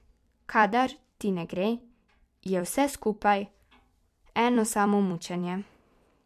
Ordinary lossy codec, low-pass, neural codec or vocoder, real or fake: MP3, 96 kbps; 14.4 kHz; vocoder, 44.1 kHz, 128 mel bands every 256 samples, BigVGAN v2; fake